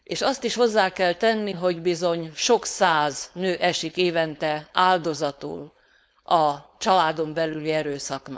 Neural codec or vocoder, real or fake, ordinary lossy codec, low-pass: codec, 16 kHz, 4.8 kbps, FACodec; fake; none; none